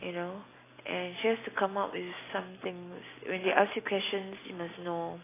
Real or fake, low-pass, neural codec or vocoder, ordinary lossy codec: real; 3.6 kHz; none; AAC, 16 kbps